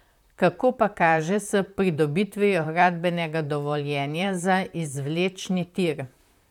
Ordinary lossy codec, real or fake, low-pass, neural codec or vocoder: none; fake; 19.8 kHz; vocoder, 44.1 kHz, 128 mel bands, Pupu-Vocoder